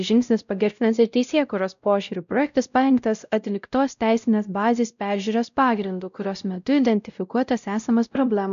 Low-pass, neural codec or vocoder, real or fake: 7.2 kHz; codec, 16 kHz, 0.5 kbps, X-Codec, WavLM features, trained on Multilingual LibriSpeech; fake